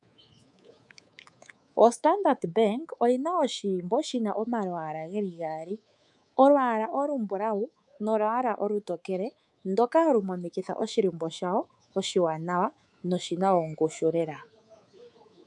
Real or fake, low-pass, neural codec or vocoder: fake; 10.8 kHz; codec, 24 kHz, 3.1 kbps, DualCodec